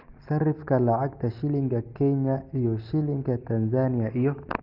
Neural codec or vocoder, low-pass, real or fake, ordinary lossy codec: none; 5.4 kHz; real; Opus, 32 kbps